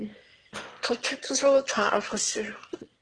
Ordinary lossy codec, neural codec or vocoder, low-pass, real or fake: Opus, 16 kbps; autoencoder, 22.05 kHz, a latent of 192 numbers a frame, VITS, trained on one speaker; 9.9 kHz; fake